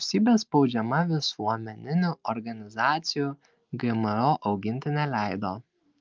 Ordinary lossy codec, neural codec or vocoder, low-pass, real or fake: Opus, 24 kbps; none; 7.2 kHz; real